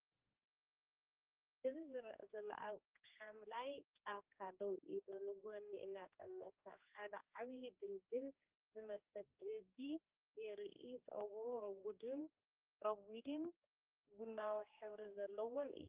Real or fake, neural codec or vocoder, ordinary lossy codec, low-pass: fake; codec, 16 kHz, 2 kbps, X-Codec, HuBERT features, trained on general audio; Opus, 24 kbps; 3.6 kHz